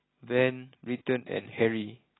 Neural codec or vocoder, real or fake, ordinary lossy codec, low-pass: none; real; AAC, 16 kbps; 7.2 kHz